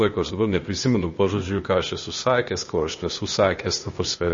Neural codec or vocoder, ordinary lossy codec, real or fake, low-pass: codec, 16 kHz, 0.8 kbps, ZipCodec; MP3, 32 kbps; fake; 7.2 kHz